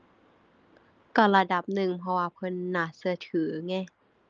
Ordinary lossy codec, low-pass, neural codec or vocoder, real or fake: Opus, 24 kbps; 7.2 kHz; none; real